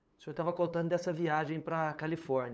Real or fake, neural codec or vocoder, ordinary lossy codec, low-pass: fake; codec, 16 kHz, 8 kbps, FunCodec, trained on LibriTTS, 25 frames a second; none; none